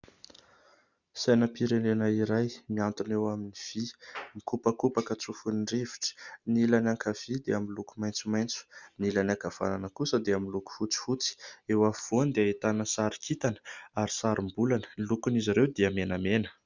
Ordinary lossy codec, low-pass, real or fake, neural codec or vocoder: Opus, 64 kbps; 7.2 kHz; real; none